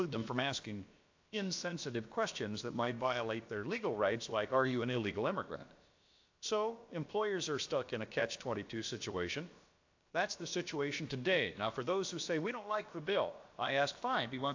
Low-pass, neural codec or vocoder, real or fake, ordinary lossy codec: 7.2 kHz; codec, 16 kHz, about 1 kbps, DyCAST, with the encoder's durations; fake; AAC, 48 kbps